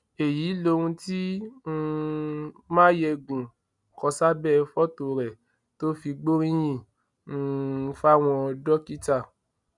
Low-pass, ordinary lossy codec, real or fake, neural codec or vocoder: 10.8 kHz; none; real; none